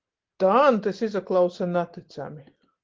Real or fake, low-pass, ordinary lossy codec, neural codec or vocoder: real; 7.2 kHz; Opus, 16 kbps; none